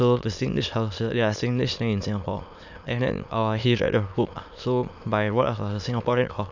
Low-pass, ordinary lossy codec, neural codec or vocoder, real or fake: 7.2 kHz; none; autoencoder, 22.05 kHz, a latent of 192 numbers a frame, VITS, trained on many speakers; fake